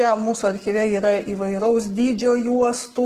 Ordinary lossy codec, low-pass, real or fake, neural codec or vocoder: Opus, 16 kbps; 14.4 kHz; fake; codec, 44.1 kHz, 7.8 kbps, Pupu-Codec